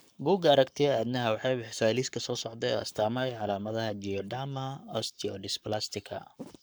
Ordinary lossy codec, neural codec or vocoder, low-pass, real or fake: none; codec, 44.1 kHz, 7.8 kbps, Pupu-Codec; none; fake